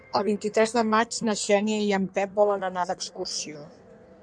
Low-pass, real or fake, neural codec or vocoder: 9.9 kHz; fake; codec, 16 kHz in and 24 kHz out, 1.1 kbps, FireRedTTS-2 codec